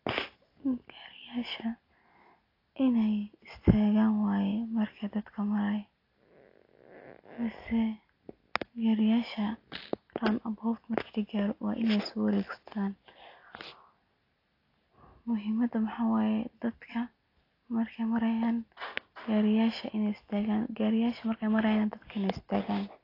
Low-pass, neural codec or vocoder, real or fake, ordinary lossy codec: 5.4 kHz; none; real; AAC, 32 kbps